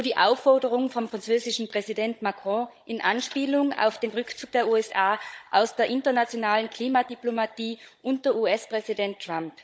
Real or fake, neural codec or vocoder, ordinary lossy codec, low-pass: fake; codec, 16 kHz, 16 kbps, FunCodec, trained on Chinese and English, 50 frames a second; none; none